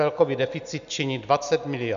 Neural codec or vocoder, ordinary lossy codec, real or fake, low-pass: none; AAC, 96 kbps; real; 7.2 kHz